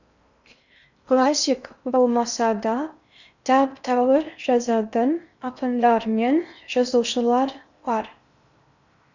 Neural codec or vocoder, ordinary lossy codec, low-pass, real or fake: codec, 16 kHz in and 24 kHz out, 0.6 kbps, FocalCodec, streaming, 4096 codes; none; 7.2 kHz; fake